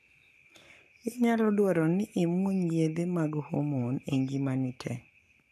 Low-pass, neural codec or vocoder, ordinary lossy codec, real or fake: 14.4 kHz; codec, 44.1 kHz, 7.8 kbps, Pupu-Codec; none; fake